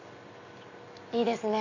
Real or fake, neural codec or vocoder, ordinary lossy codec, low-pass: real; none; none; 7.2 kHz